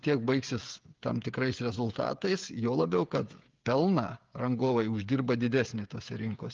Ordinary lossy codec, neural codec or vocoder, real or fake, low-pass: Opus, 24 kbps; codec, 16 kHz, 8 kbps, FreqCodec, smaller model; fake; 7.2 kHz